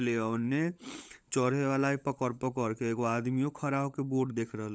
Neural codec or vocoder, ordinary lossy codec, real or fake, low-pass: codec, 16 kHz, 16 kbps, FunCodec, trained on LibriTTS, 50 frames a second; none; fake; none